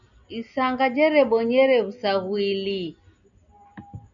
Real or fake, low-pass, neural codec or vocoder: real; 7.2 kHz; none